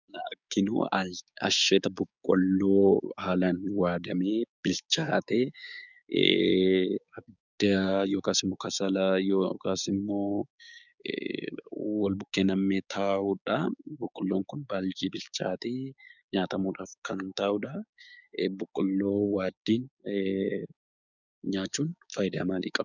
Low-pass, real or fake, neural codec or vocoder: 7.2 kHz; fake; codec, 16 kHz, 6 kbps, DAC